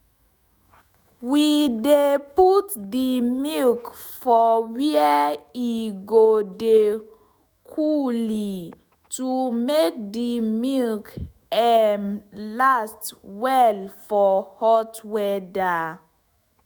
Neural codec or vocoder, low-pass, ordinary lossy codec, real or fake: autoencoder, 48 kHz, 128 numbers a frame, DAC-VAE, trained on Japanese speech; none; none; fake